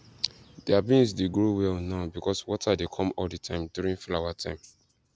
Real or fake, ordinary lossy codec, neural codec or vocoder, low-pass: real; none; none; none